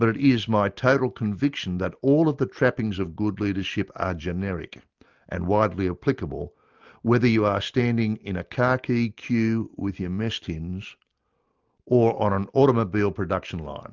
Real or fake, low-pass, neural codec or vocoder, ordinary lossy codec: real; 7.2 kHz; none; Opus, 24 kbps